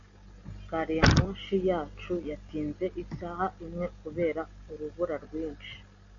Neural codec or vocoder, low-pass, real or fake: none; 7.2 kHz; real